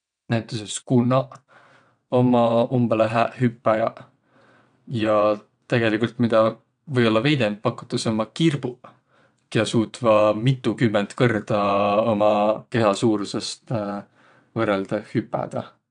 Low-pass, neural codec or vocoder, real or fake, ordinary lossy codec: 9.9 kHz; vocoder, 22.05 kHz, 80 mel bands, WaveNeXt; fake; none